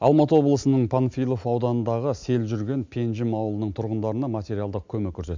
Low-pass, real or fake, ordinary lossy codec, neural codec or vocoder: 7.2 kHz; real; MP3, 64 kbps; none